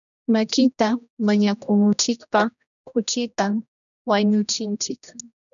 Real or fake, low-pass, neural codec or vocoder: fake; 7.2 kHz; codec, 16 kHz, 1 kbps, X-Codec, HuBERT features, trained on general audio